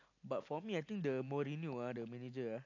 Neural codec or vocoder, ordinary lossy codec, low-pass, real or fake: none; AAC, 48 kbps; 7.2 kHz; real